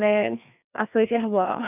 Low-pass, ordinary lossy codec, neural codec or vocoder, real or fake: 3.6 kHz; none; codec, 16 kHz, 2 kbps, FreqCodec, larger model; fake